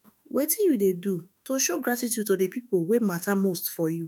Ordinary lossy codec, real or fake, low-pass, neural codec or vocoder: none; fake; none; autoencoder, 48 kHz, 32 numbers a frame, DAC-VAE, trained on Japanese speech